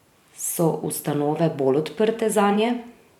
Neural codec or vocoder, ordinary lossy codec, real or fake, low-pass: vocoder, 44.1 kHz, 128 mel bands every 512 samples, BigVGAN v2; none; fake; 19.8 kHz